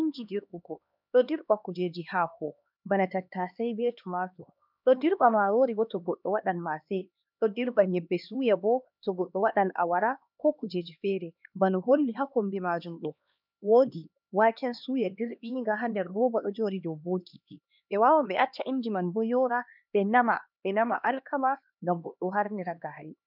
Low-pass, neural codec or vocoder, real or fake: 5.4 kHz; codec, 16 kHz, 2 kbps, X-Codec, HuBERT features, trained on LibriSpeech; fake